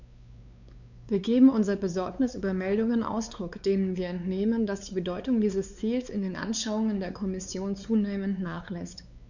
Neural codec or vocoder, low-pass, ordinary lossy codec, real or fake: codec, 16 kHz, 4 kbps, X-Codec, WavLM features, trained on Multilingual LibriSpeech; 7.2 kHz; none; fake